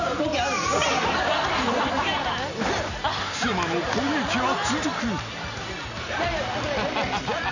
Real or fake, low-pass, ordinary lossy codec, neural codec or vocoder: real; 7.2 kHz; none; none